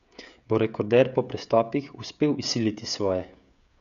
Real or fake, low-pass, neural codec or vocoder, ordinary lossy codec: fake; 7.2 kHz; codec, 16 kHz, 16 kbps, FreqCodec, smaller model; none